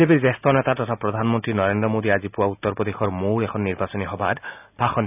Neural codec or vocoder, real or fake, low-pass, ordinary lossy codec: none; real; 3.6 kHz; none